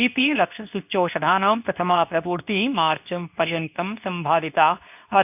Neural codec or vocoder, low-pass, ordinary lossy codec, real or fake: codec, 24 kHz, 0.9 kbps, WavTokenizer, medium speech release version 2; 3.6 kHz; none; fake